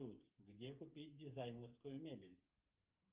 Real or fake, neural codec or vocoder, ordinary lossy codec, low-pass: fake; codec, 16 kHz, 8 kbps, FreqCodec, smaller model; Opus, 32 kbps; 3.6 kHz